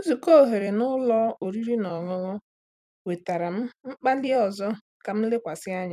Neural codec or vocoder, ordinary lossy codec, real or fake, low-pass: vocoder, 44.1 kHz, 128 mel bands every 256 samples, BigVGAN v2; none; fake; 14.4 kHz